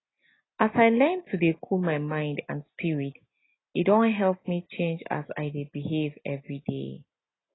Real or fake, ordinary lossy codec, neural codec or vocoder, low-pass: real; AAC, 16 kbps; none; 7.2 kHz